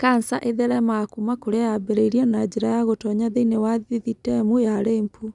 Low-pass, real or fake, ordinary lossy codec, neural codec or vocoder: 10.8 kHz; real; none; none